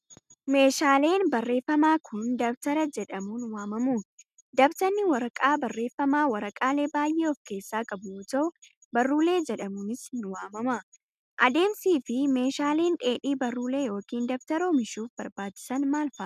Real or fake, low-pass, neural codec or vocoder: real; 14.4 kHz; none